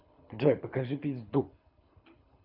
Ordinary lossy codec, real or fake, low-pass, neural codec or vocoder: none; fake; 5.4 kHz; codec, 24 kHz, 6 kbps, HILCodec